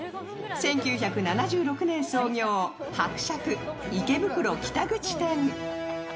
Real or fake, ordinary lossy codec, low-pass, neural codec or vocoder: real; none; none; none